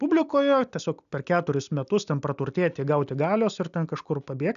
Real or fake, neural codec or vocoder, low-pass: real; none; 7.2 kHz